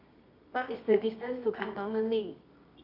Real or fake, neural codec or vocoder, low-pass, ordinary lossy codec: fake; codec, 24 kHz, 0.9 kbps, WavTokenizer, medium music audio release; 5.4 kHz; none